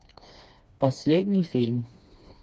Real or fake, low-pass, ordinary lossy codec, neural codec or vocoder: fake; none; none; codec, 16 kHz, 2 kbps, FreqCodec, smaller model